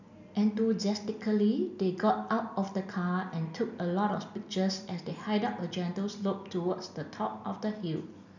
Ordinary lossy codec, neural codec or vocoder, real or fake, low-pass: none; none; real; 7.2 kHz